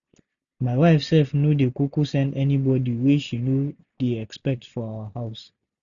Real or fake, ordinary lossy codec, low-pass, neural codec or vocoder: real; none; 7.2 kHz; none